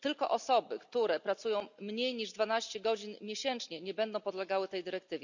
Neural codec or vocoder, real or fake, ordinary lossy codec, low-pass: none; real; none; 7.2 kHz